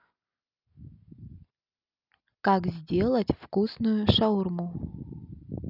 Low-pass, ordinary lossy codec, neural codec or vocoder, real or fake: 5.4 kHz; none; none; real